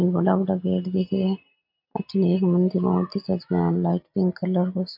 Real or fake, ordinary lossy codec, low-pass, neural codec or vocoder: real; MP3, 48 kbps; 5.4 kHz; none